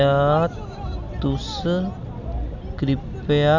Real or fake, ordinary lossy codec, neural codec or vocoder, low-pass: real; none; none; 7.2 kHz